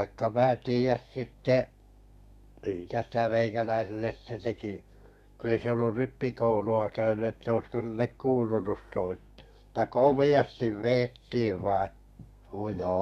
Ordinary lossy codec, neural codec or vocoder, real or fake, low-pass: none; codec, 32 kHz, 1.9 kbps, SNAC; fake; 14.4 kHz